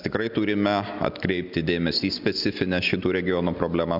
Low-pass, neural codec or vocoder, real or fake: 5.4 kHz; none; real